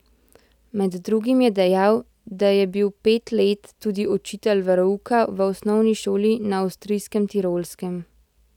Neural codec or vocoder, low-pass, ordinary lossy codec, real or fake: none; 19.8 kHz; none; real